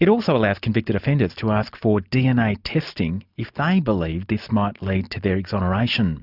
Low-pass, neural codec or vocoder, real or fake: 5.4 kHz; none; real